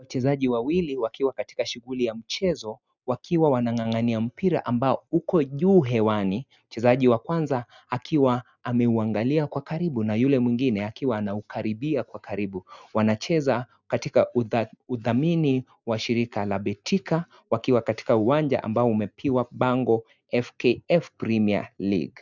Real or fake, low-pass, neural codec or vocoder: real; 7.2 kHz; none